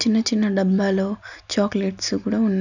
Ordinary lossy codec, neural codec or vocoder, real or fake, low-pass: none; none; real; 7.2 kHz